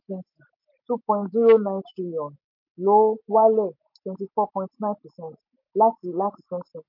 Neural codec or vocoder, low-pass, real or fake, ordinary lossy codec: none; 5.4 kHz; real; MP3, 32 kbps